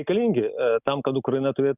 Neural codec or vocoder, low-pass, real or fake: none; 3.6 kHz; real